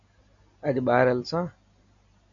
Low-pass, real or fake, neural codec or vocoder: 7.2 kHz; real; none